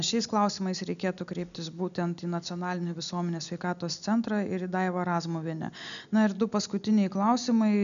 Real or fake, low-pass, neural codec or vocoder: real; 7.2 kHz; none